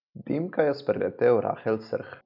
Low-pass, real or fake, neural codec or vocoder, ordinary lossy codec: 5.4 kHz; real; none; none